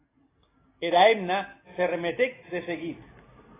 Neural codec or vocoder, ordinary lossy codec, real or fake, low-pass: none; AAC, 16 kbps; real; 3.6 kHz